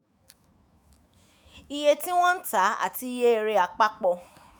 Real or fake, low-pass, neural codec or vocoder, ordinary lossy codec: fake; none; autoencoder, 48 kHz, 128 numbers a frame, DAC-VAE, trained on Japanese speech; none